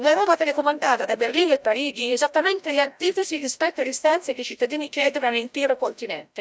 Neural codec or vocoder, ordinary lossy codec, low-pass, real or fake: codec, 16 kHz, 0.5 kbps, FreqCodec, larger model; none; none; fake